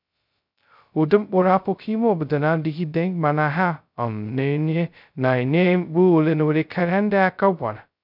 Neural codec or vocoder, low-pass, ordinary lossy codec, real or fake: codec, 16 kHz, 0.2 kbps, FocalCodec; 5.4 kHz; none; fake